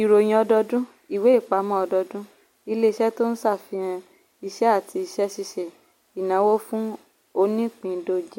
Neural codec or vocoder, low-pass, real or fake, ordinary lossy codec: none; 19.8 kHz; real; MP3, 64 kbps